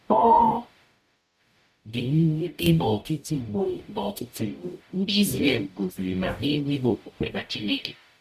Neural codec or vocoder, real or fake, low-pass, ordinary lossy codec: codec, 44.1 kHz, 0.9 kbps, DAC; fake; 14.4 kHz; none